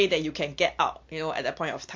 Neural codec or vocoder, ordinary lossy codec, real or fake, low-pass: none; MP3, 48 kbps; real; 7.2 kHz